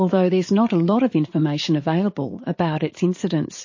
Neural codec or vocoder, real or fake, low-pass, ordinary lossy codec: vocoder, 44.1 kHz, 80 mel bands, Vocos; fake; 7.2 kHz; MP3, 32 kbps